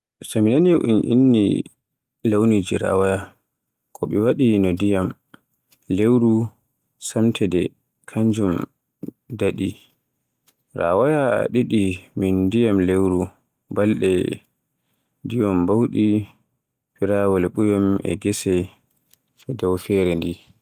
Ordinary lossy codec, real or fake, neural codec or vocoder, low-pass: Opus, 32 kbps; real; none; 14.4 kHz